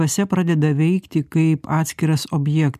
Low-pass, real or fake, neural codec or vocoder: 14.4 kHz; real; none